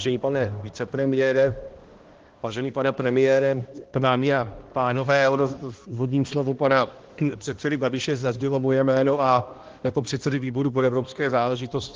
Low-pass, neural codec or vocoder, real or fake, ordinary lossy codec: 7.2 kHz; codec, 16 kHz, 1 kbps, X-Codec, HuBERT features, trained on balanced general audio; fake; Opus, 16 kbps